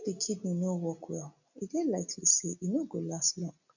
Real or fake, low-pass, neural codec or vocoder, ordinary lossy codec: real; 7.2 kHz; none; none